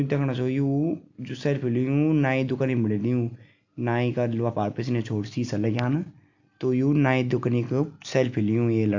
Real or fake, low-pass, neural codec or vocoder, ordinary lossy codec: real; 7.2 kHz; none; AAC, 48 kbps